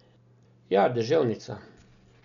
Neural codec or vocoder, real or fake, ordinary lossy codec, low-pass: none; real; none; 7.2 kHz